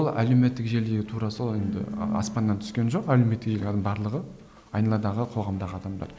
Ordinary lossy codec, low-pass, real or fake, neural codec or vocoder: none; none; real; none